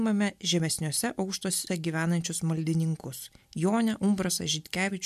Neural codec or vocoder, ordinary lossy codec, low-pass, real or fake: none; MP3, 96 kbps; 14.4 kHz; real